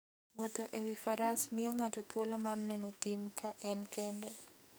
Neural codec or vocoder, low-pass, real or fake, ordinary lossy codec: codec, 44.1 kHz, 2.6 kbps, SNAC; none; fake; none